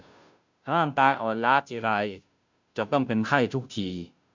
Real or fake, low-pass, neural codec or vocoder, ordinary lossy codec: fake; 7.2 kHz; codec, 16 kHz, 0.5 kbps, FunCodec, trained on Chinese and English, 25 frames a second; MP3, 64 kbps